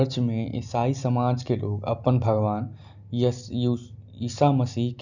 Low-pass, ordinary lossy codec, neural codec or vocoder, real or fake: 7.2 kHz; none; none; real